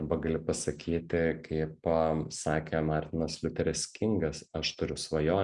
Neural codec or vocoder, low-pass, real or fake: none; 10.8 kHz; real